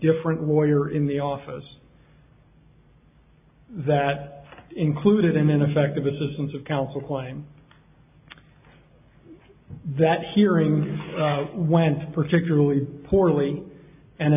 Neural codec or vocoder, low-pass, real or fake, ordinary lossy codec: none; 3.6 kHz; real; AAC, 32 kbps